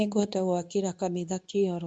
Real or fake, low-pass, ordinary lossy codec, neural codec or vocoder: fake; 9.9 kHz; none; codec, 24 kHz, 0.9 kbps, WavTokenizer, medium speech release version 2